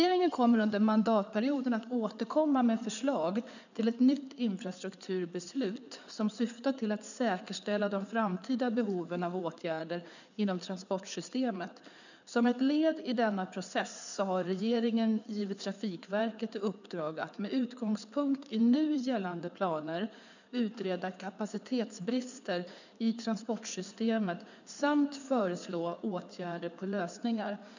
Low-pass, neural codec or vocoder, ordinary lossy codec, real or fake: 7.2 kHz; codec, 16 kHz in and 24 kHz out, 2.2 kbps, FireRedTTS-2 codec; none; fake